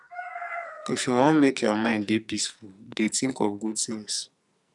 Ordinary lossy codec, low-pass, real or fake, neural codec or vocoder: none; 10.8 kHz; fake; codec, 44.1 kHz, 2.6 kbps, SNAC